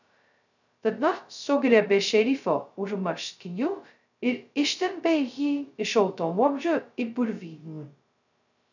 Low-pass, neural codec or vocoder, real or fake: 7.2 kHz; codec, 16 kHz, 0.2 kbps, FocalCodec; fake